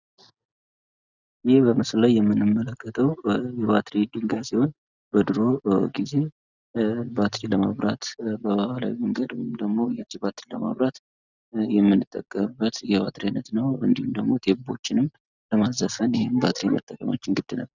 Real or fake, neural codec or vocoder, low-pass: real; none; 7.2 kHz